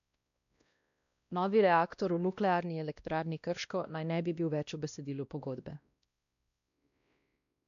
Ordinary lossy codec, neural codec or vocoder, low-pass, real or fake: none; codec, 16 kHz, 1 kbps, X-Codec, WavLM features, trained on Multilingual LibriSpeech; 7.2 kHz; fake